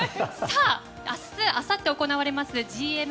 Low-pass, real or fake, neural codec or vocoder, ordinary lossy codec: none; real; none; none